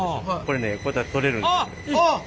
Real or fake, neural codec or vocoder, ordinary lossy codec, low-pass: real; none; none; none